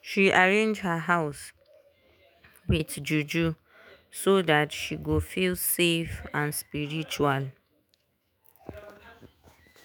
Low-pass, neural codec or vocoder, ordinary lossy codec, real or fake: none; autoencoder, 48 kHz, 128 numbers a frame, DAC-VAE, trained on Japanese speech; none; fake